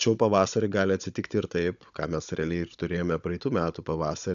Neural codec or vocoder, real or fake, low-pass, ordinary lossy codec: codec, 16 kHz, 16 kbps, FunCodec, trained on Chinese and English, 50 frames a second; fake; 7.2 kHz; AAC, 96 kbps